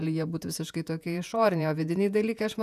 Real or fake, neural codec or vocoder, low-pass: fake; vocoder, 48 kHz, 128 mel bands, Vocos; 14.4 kHz